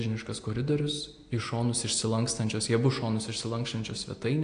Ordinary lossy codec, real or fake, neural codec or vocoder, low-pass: AAC, 48 kbps; real; none; 9.9 kHz